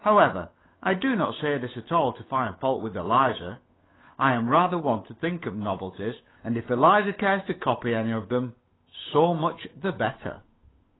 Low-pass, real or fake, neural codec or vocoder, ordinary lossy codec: 7.2 kHz; fake; codec, 16 kHz, 8 kbps, FunCodec, trained on Chinese and English, 25 frames a second; AAC, 16 kbps